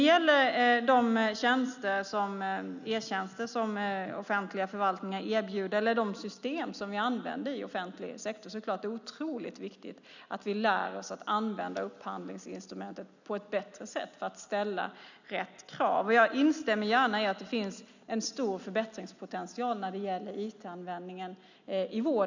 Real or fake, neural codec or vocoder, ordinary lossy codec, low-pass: real; none; none; 7.2 kHz